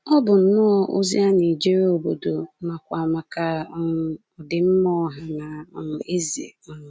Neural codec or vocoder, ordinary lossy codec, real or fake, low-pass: none; none; real; none